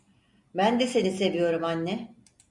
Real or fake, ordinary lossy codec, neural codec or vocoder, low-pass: real; MP3, 64 kbps; none; 10.8 kHz